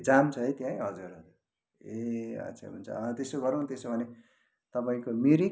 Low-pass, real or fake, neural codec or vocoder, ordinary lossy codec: none; real; none; none